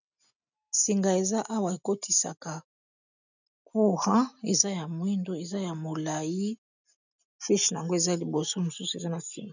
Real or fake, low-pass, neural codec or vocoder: real; 7.2 kHz; none